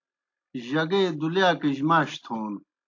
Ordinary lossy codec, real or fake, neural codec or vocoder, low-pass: AAC, 48 kbps; real; none; 7.2 kHz